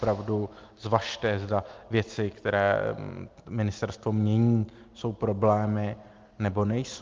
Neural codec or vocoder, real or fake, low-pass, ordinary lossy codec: none; real; 7.2 kHz; Opus, 24 kbps